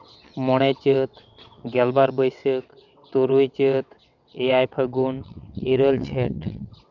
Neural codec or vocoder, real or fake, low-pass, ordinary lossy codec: vocoder, 22.05 kHz, 80 mel bands, WaveNeXt; fake; 7.2 kHz; none